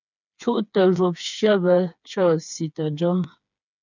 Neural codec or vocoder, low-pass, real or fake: codec, 16 kHz, 4 kbps, FreqCodec, smaller model; 7.2 kHz; fake